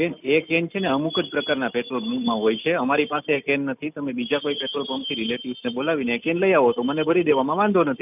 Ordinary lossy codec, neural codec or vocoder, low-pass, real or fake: none; none; 3.6 kHz; real